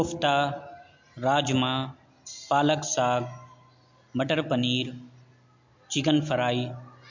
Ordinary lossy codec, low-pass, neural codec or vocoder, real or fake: MP3, 48 kbps; 7.2 kHz; none; real